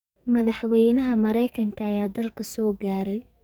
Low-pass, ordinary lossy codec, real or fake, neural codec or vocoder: none; none; fake; codec, 44.1 kHz, 2.6 kbps, SNAC